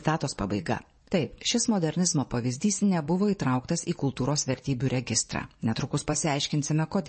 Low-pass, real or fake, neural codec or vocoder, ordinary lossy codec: 10.8 kHz; fake; vocoder, 24 kHz, 100 mel bands, Vocos; MP3, 32 kbps